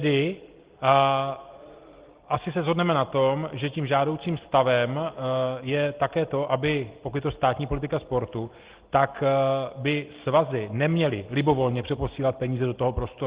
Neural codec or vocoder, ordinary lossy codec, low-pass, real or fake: none; Opus, 16 kbps; 3.6 kHz; real